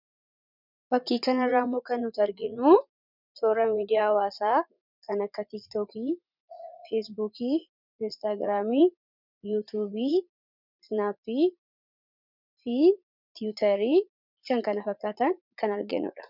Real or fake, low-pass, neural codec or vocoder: fake; 5.4 kHz; vocoder, 44.1 kHz, 80 mel bands, Vocos